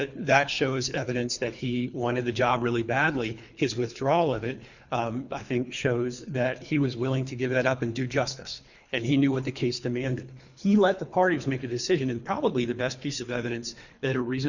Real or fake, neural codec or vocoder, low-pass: fake; codec, 24 kHz, 3 kbps, HILCodec; 7.2 kHz